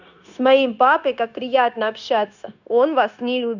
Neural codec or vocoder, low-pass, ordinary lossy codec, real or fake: codec, 16 kHz, 0.9 kbps, LongCat-Audio-Codec; 7.2 kHz; none; fake